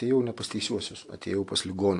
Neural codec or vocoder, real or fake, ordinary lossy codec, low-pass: none; real; AAC, 48 kbps; 10.8 kHz